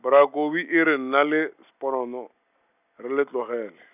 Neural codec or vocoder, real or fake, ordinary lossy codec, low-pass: none; real; none; 3.6 kHz